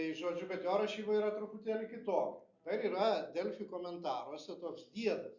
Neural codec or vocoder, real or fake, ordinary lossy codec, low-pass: none; real; Opus, 64 kbps; 7.2 kHz